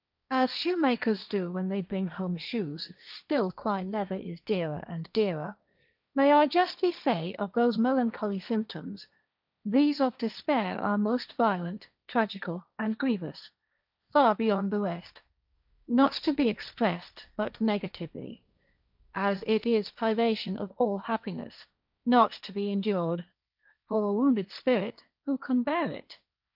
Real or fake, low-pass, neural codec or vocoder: fake; 5.4 kHz; codec, 16 kHz, 1.1 kbps, Voila-Tokenizer